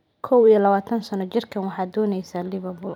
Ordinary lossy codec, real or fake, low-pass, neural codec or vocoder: none; fake; 19.8 kHz; vocoder, 44.1 kHz, 128 mel bands every 512 samples, BigVGAN v2